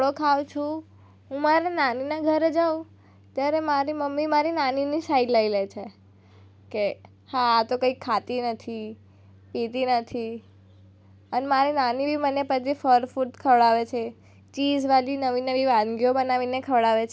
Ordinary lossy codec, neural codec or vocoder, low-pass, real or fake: none; none; none; real